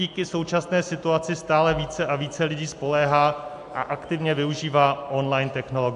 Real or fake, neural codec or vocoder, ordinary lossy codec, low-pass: real; none; AAC, 64 kbps; 10.8 kHz